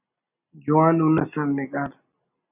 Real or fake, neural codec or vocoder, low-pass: real; none; 3.6 kHz